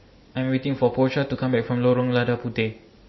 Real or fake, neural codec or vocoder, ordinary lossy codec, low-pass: real; none; MP3, 24 kbps; 7.2 kHz